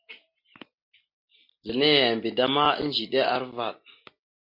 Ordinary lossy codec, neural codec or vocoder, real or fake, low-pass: MP3, 32 kbps; none; real; 5.4 kHz